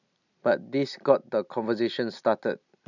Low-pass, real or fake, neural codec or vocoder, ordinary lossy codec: 7.2 kHz; real; none; none